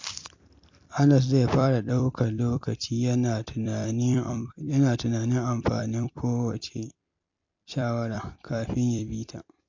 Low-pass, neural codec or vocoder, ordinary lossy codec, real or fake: 7.2 kHz; vocoder, 44.1 kHz, 128 mel bands every 256 samples, BigVGAN v2; MP3, 48 kbps; fake